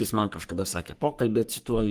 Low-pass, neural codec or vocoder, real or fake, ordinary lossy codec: 14.4 kHz; codec, 44.1 kHz, 3.4 kbps, Pupu-Codec; fake; Opus, 24 kbps